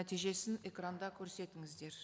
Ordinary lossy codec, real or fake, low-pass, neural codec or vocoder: none; real; none; none